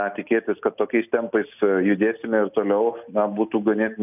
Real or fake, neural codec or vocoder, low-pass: real; none; 3.6 kHz